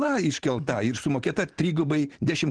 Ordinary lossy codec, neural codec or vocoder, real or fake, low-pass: Opus, 16 kbps; vocoder, 44.1 kHz, 128 mel bands every 512 samples, BigVGAN v2; fake; 9.9 kHz